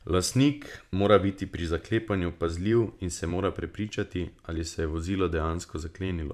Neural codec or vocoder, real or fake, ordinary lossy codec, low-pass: vocoder, 44.1 kHz, 128 mel bands every 512 samples, BigVGAN v2; fake; MP3, 96 kbps; 14.4 kHz